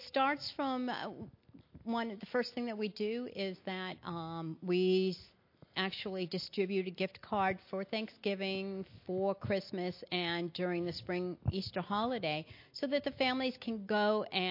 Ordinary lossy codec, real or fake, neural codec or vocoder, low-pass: MP3, 32 kbps; real; none; 5.4 kHz